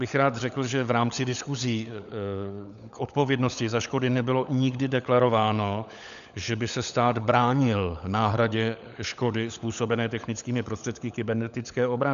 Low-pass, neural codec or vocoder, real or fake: 7.2 kHz; codec, 16 kHz, 16 kbps, FunCodec, trained on LibriTTS, 50 frames a second; fake